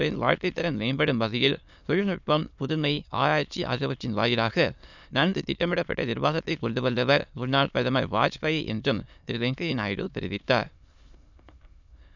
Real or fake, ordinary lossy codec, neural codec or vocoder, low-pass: fake; none; autoencoder, 22.05 kHz, a latent of 192 numbers a frame, VITS, trained on many speakers; 7.2 kHz